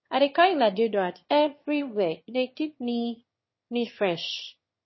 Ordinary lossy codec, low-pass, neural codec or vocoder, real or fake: MP3, 24 kbps; 7.2 kHz; autoencoder, 22.05 kHz, a latent of 192 numbers a frame, VITS, trained on one speaker; fake